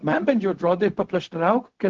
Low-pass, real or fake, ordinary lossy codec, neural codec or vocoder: 7.2 kHz; fake; Opus, 16 kbps; codec, 16 kHz, 0.4 kbps, LongCat-Audio-Codec